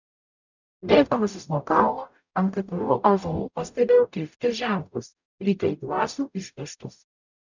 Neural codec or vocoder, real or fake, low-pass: codec, 44.1 kHz, 0.9 kbps, DAC; fake; 7.2 kHz